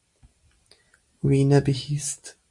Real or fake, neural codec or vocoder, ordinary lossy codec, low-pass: real; none; Opus, 64 kbps; 10.8 kHz